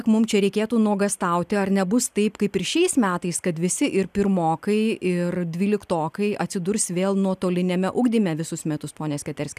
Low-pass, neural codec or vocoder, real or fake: 14.4 kHz; none; real